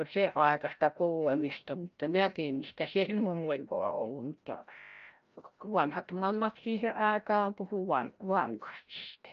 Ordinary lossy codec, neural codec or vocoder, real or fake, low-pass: Opus, 24 kbps; codec, 16 kHz, 0.5 kbps, FreqCodec, larger model; fake; 7.2 kHz